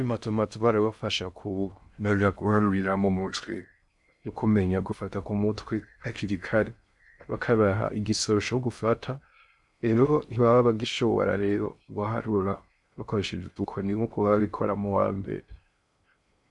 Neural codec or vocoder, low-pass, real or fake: codec, 16 kHz in and 24 kHz out, 0.6 kbps, FocalCodec, streaming, 2048 codes; 10.8 kHz; fake